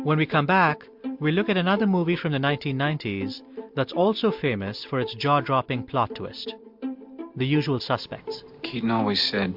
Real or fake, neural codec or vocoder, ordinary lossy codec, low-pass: real; none; MP3, 48 kbps; 5.4 kHz